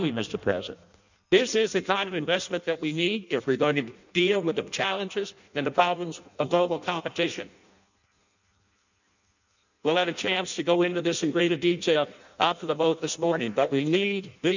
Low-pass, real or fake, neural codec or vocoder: 7.2 kHz; fake; codec, 16 kHz in and 24 kHz out, 0.6 kbps, FireRedTTS-2 codec